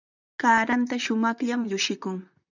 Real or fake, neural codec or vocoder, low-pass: fake; vocoder, 44.1 kHz, 128 mel bands, Pupu-Vocoder; 7.2 kHz